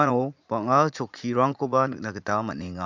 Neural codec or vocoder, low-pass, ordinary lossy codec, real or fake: vocoder, 44.1 kHz, 80 mel bands, Vocos; 7.2 kHz; MP3, 64 kbps; fake